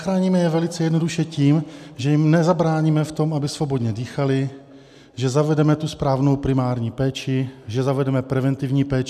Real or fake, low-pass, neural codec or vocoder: real; 14.4 kHz; none